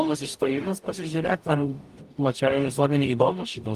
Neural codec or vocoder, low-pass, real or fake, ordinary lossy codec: codec, 44.1 kHz, 0.9 kbps, DAC; 14.4 kHz; fake; Opus, 32 kbps